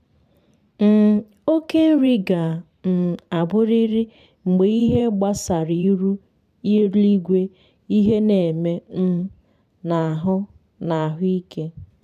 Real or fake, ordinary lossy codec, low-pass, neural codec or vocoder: fake; none; 14.4 kHz; vocoder, 44.1 kHz, 128 mel bands every 512 samples, BigVGAN v2